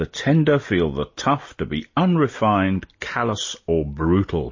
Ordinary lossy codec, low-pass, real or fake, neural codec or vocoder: MP3, 32 kbps; 7.2 kHz; real; none